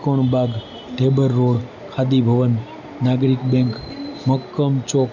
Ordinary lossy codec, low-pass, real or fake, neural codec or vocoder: none; 7.2 kHz; real; none